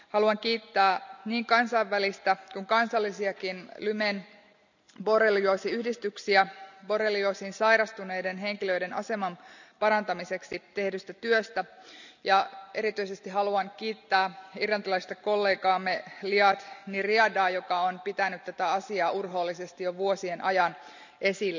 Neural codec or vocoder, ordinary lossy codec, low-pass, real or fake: none; none; 7.2 kHz; real